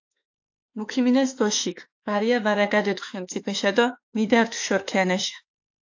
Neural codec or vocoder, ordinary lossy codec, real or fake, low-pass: autoencoder, 48 kHz, 32 numbers a frame, DAC-VAE, trained on Japanese speech; AAC, 48 kbps; fake; 7.2 kHz